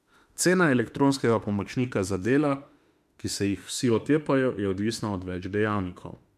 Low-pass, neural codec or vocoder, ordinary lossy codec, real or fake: 14.4 kHz; autoencoder, 48 kHz, 32 numbers a frame, DAC-VAE, trained on Japanese speech; MP3, 96 kbps; fake